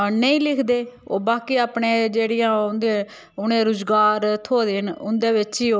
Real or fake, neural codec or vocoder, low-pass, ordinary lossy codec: real; none; none; none